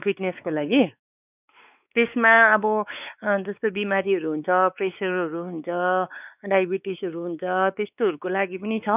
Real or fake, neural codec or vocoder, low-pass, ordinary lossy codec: fake; codec, 16 kHz, 4 kbps, X-Codec, WavLM features, trained on Multilingual LibriSpeech; 3.6 kHz; none